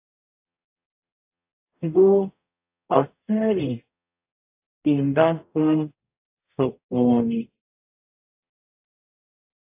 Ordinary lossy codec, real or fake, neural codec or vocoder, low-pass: AAC, 32 kbps; fake; codec, 44.1 kHz, 0.9 kbps, DAC; 3.6 kHz